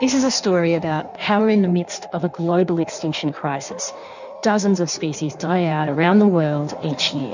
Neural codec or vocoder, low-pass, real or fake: codec, 16 kHz in and 24 kHz out, 1.1 kbps, FireRedTTS-2 codec; 7.2 kHz; fake